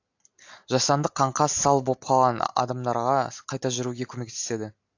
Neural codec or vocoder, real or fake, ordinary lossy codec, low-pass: none; real; AAC, 48 kbps; 7.2 kHz